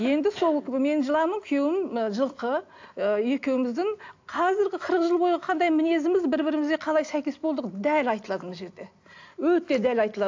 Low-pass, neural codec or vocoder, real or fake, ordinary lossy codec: 7.2 kHz; none; real; AAC, 48 kbps